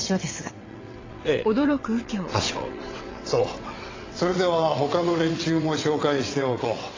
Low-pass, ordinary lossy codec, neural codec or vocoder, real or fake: 7.2 kHz; AAC, 32 kbps; vocoder, 22.05 kHz, 80 mel bands, WaveNeXt; fake